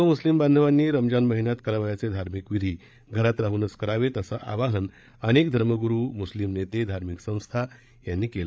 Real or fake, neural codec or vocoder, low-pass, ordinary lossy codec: fake; codec, 16 kHz, 8 kbps, FreqCodec, larger model; none; none